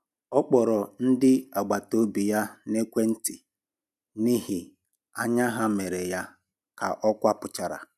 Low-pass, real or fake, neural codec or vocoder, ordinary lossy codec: 14.4 kHz; real; none; none